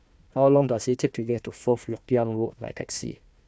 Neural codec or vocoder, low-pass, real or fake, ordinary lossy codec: codec, 16 kHz, 1 kbps, FunCodec, trained on Chinese and English, 50 frames a second; none; fake; none